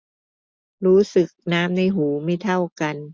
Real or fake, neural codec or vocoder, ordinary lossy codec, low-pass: real; none; none; none